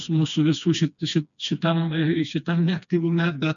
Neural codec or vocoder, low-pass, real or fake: codec, 16 kHz, 2 kbps, FreqCodec, smaller model; 7.2 kHz; fake